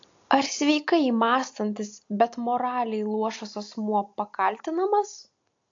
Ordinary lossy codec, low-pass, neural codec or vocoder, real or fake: AAC, 48 kbps; 7.2 kHz; none; real